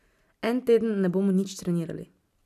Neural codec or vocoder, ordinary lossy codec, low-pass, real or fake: none; none; 14.4 kHz; real